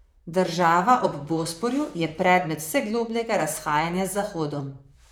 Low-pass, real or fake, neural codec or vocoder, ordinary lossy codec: none; fake; vocoder, 44.1 kHz, 128 mel bands, Pupu-Vocoder; none